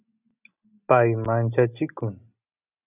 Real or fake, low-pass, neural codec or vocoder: real; 3.6 kHz; none